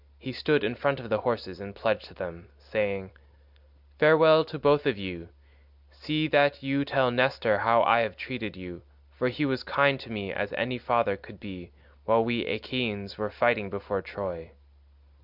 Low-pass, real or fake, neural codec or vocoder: 5.4 kHz; real; none